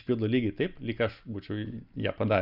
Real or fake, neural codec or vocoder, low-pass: real; none; 5.4 kHz